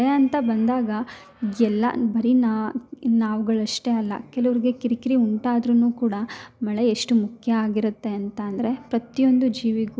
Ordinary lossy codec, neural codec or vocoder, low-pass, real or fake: none; none; none; real